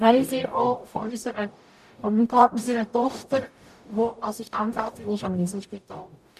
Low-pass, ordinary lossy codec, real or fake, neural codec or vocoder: 14.4 kHz; none; fake; codec, 44.1 kHz, 0.9 kbps, DAC